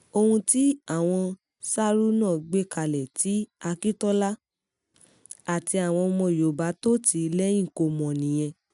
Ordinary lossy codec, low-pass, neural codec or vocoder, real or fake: none; 10.8 kHz; none; real